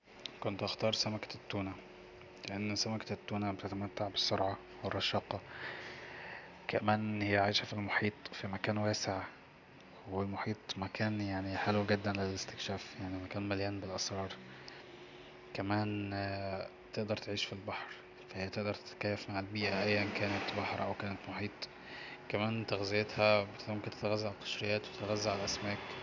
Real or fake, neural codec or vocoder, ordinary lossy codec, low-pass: real; none; none; 7.2 kHz